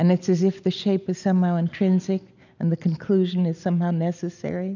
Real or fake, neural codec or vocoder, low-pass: real; none; 7.2 kHz